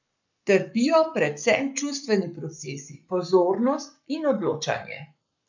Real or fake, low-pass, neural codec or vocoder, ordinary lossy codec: fake; 7.2 kHz; codec, 44.1 kHz, 7.8 kbps, Pupu-Codec; none